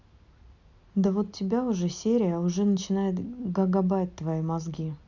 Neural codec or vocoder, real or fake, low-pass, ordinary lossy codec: none; real; 7.2 kHz; none